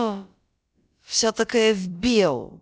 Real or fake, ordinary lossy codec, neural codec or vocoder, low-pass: fake; none; codec, 16 kHz, about 1 kbps, DyCAST, with the encoder's durations; none